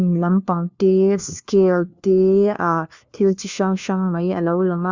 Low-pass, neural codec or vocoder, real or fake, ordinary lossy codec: 7.2 kHz; codec, 16 kHz, 1 kbps, FunCodec, trained on LibriTTS, 50 frames a second; fake; Opus, 64 kbps